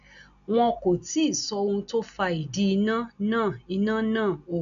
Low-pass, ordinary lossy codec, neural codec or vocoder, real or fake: 7.2 kHz; none; none; real